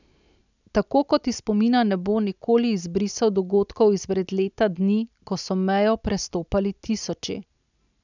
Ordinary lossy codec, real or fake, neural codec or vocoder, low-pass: none; real; none; 7.2 kHz